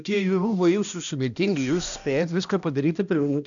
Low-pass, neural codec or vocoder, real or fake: 7.2 kHz; codec, 16 kHz, 1 kbps, X-Codec, HuBERT features, trained on balanced general audio; fake